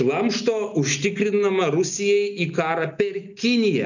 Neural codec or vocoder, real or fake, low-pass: none; real; 7.2 kHz